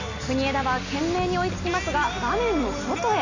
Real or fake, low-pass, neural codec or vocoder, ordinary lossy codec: real; 7.2 kHz; none; none